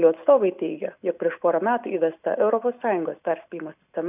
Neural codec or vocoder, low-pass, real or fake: none; 3.6 kHz; real